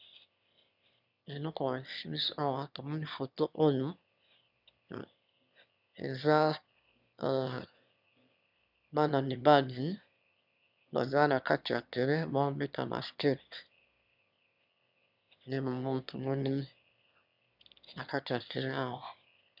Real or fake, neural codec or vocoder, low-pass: fake; autoencoder, 22.05 kHz, a latent of 192 numbers a frame, VITS, trained on one speaker; 5.4 kHz